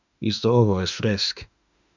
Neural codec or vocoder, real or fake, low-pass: autoencoder, 48 kHz, 32 numbers a frame, DAC-VAE, trained on Japanese speech; fake; 7.2 kHz